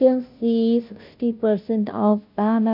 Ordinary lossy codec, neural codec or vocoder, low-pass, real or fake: MP3, 48 kbps; codec, 16 kHz, 0.5 kbps, FunCodec, trained on Chinese and English, 25 frames a second; 5.4 kHz; fake